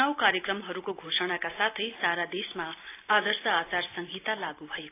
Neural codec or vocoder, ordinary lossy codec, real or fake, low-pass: none; AAC, 24 kbps; real; 3.6 kHz